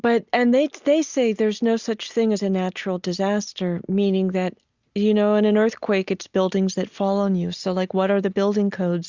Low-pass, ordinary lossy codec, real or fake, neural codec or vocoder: 7.2 kHz; Opus, 64 kbps; real; none